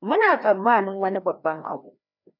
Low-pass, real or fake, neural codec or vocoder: 5.4 kHz; fake; codec, 16 kHz, 1 kbps, FreqCodec, larger model